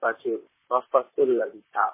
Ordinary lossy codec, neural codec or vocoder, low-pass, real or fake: MP3, 16 kbps; vocoder, 44.1 kHz, 128 mel bands, Pupu-Vocoder; 3.6 kHz; fake